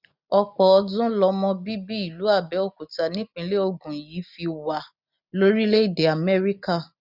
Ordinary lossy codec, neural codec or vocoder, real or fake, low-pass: none; none; real; 5.4 kHz